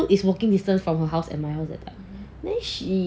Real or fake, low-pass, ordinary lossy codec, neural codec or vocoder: real; none; none; none